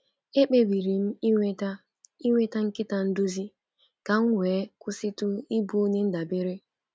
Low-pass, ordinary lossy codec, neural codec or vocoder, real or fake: none; none; none; real